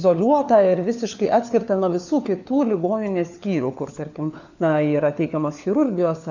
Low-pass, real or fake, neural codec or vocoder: 7.2 kHz; fake; codec, 16 kHz, 4 kbps, FunCodec, trained on LibriTTS, 50 frames a second